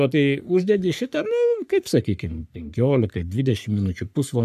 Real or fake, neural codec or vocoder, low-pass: fake; codec, 44.1 kHz, 3.4 kbps, Pupu-Codec; 14.4 kHz